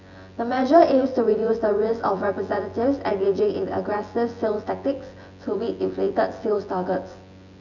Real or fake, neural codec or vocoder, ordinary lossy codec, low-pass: fake; vocoder, 24 kHz, 100 mel bands, Vocos; none; 7.2 kHz